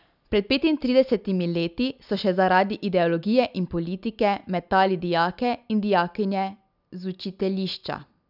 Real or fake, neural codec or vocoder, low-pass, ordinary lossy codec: real; none; 5.4 kHz; none